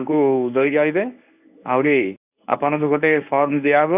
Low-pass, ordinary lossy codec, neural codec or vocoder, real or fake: 3.6 kHz; none; codec, 24 kHz, 0.9 kbps, WavTokenizer, medium speech release version 1; fake